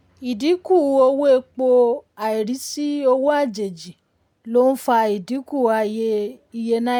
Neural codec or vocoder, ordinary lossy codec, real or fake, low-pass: none; none; real; 19.8 kHz